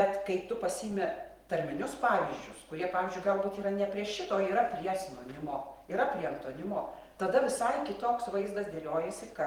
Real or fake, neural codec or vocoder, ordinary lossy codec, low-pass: fake; vocoder, 44.1 kHz, 128 mel bands every 512 samples, BigVGAN v2; Opus, 24 kbps; 19.8 kHz